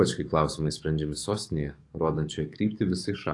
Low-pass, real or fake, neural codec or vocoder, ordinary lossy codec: 10.8 kHz; fake; codec, 44.1 kHz, 7.8 kbps, DAC; AAC, 48 kbps